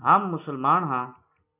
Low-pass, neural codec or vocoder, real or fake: 3.6 kHz; none; real